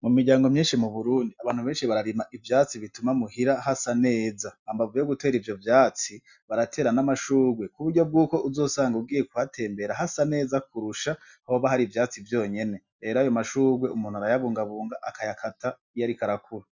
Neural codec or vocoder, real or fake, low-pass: none; real; 7.2 kHz